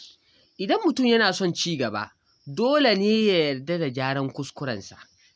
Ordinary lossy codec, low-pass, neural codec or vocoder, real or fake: none; none; none; real